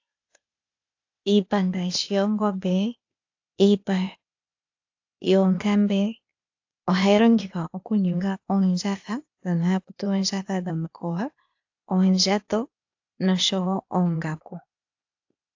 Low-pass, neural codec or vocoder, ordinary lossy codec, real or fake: 7.2 kHz; codec, 16 kHz, 0.8 kbps, ZipCodec; AAC, 48 kbps; fake